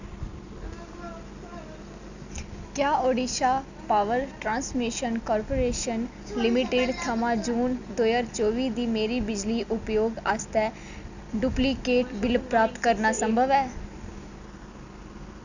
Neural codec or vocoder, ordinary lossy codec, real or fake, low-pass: none; none; real; 7.2 kHz